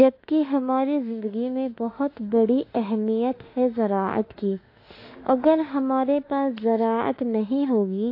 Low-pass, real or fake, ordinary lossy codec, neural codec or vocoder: 5.4 kHz; fake; AAC, 32 kbps; autoencoder, 48 kHz, 32 numbers a frame, DAC-VAE, trained on Japanese speech